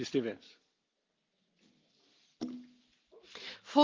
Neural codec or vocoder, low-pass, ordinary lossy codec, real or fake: none; 7.2 kHz; Opus, 24 kbps; real